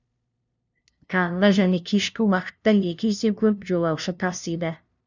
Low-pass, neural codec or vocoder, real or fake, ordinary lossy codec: 7.2 kHz; codec, 16 kHz, 0.5 kbps, FunCodec, trained on LibriTTS, 25 frames a second; fake; none